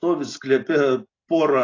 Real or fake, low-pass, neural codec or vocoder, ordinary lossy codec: real; 7.2 kHz; none; AAC, 32 kbps